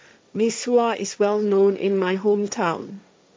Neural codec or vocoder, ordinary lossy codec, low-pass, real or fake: codec, 16 kHz, 1.1 kbps, Voila-Tokenizer; none; none; fake